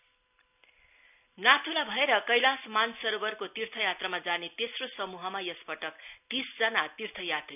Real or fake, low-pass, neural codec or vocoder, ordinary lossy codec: real; 3.6 kHz; none; none